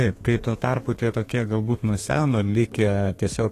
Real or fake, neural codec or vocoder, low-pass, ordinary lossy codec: fake; codec, 32 kHz, 1.9 kbps, SNAC; 14.4 kHz; AAC, 48 kbps